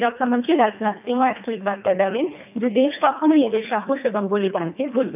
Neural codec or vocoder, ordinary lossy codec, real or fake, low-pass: codec, 24 kHz, 1.5 kbps, HILCodec; none; fake; 3.6 kHz